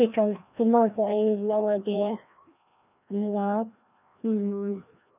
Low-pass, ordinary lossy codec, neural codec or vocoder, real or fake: 3.6 kHz; none; codec, 16 kHz, 1 kbps, FreqCodec, larger model; fake